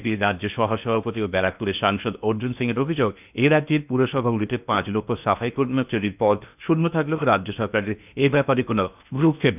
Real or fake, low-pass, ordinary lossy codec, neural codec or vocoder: fake; 3.6 kHz; none; codec, 16 kHz in and 24 kHz out, 0.8 kbps, FocalCodec, streaming, 65536 codes